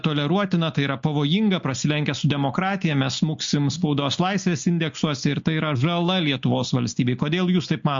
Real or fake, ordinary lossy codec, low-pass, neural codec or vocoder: real; MP3, 48 kbps; 7.2 kHz; none